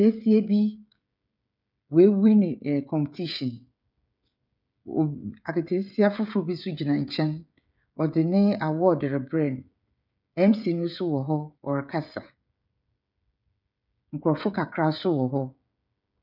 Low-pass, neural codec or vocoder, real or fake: 5.4 kHz; codec, 16 kHz, 16 kbps, FreqCodec, smaller model; fake